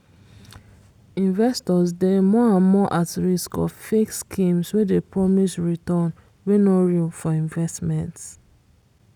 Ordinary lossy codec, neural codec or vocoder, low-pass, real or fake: none; none; 19.8 kHz; real